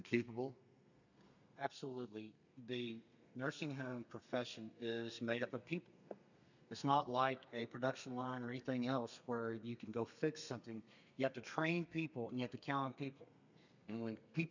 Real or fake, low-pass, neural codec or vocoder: fake; 7.2 kHz; codec, 32 kHz, 1.9 kbps, SNAC